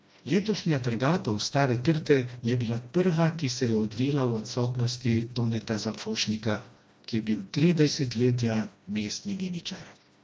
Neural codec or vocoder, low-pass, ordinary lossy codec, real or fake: codec, 16 kHz, 1 kbps, FreqCodec, smaller model; none; none; fake